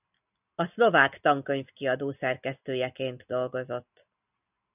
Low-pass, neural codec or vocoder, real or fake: 3.6 kHz; none; real